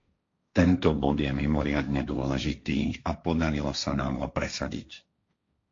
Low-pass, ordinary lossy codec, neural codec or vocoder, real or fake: 7.2 kHz; AAC, 48 kbps; codec, 16 kHz, 1.1 kbps, Voila-Tokenizer; fake